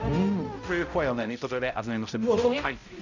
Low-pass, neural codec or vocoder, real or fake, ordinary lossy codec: 7.2 kHz; codec, 16 kHz, 0.5 kbps, X-Codec, HuBERT features, trained on balanced general audio; fake; none